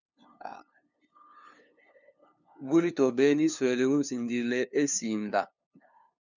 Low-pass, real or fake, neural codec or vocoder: 7.2 kHz; fake; codec, 16 kHz, 2 kbps, FunCodec, trained on LibriTTS, 25 frames a second